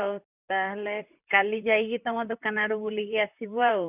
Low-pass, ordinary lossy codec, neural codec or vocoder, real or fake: 3.6 kHz; none; vocoder, 44.1 kHz, 128 mel bands, Pupu-Vocoder; fake